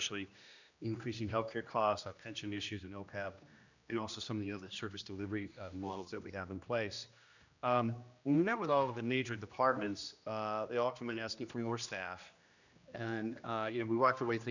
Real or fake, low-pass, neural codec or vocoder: fake; 7.2 kHz; codec, 16 kHz, 1 kbps, X-Codec, HuBERT features, trained on general audio